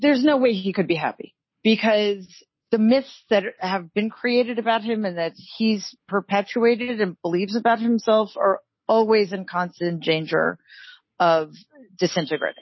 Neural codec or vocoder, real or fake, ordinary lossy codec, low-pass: none; real; MP3, 24 kbps; 7.2 kHz